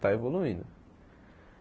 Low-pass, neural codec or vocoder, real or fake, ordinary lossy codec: none; none; real; none